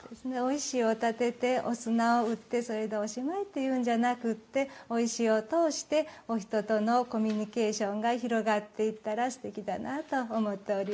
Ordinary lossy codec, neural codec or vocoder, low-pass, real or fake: none; none; none; real